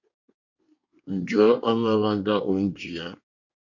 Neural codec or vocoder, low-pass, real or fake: codec, 24 kHz, 1 kbps, SNAC; 7.2 kHz; fake